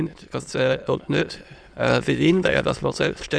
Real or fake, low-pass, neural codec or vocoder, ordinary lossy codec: fake; none; autoencoder, 22.05 kHz, a latent of 192 numbers a frame, VITS, trained on many speakers; none